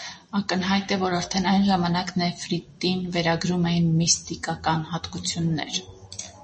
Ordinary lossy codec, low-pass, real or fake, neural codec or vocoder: MP3, 32 kbps; 10.8 kHz; real; none